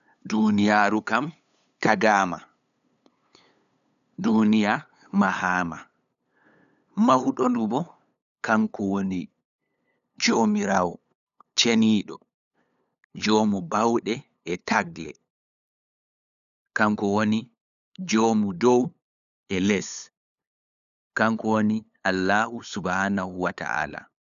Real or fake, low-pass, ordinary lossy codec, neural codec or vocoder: fake; 7.2 kHz; none; codec, 16 kHz, 8 kbps, FunCodec, trained on LibriTTS, 25 frames a second